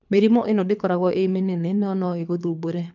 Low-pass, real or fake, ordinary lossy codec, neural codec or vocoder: 7.2 kHz; fake; none; codec, 24 kHz, 3 kbps, HILCodec